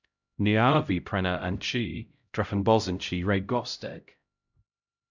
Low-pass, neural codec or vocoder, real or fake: 7.2 kHz; codec, 16 kHz, 0.5 kbps, X-Codec, HuBERT features, trained on LibriSpeech; fake